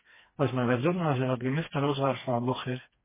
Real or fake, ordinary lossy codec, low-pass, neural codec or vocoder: fake; MP3, 16 kbps; 3.6 kHz; codec, 16 kHz, 2 kbps, FreqCodec, smaller model